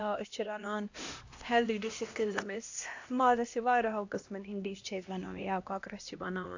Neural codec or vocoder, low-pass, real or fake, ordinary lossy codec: codec, 16 kHz, 1 kbps, X-Codec, HuBERT features, trained on LibriSpeech; 7.2 kHz; fake; AAC, 48 kbps